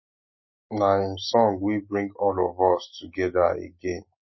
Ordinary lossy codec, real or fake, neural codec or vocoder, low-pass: MP3, 24 kbps; real; none; 7.2 kHz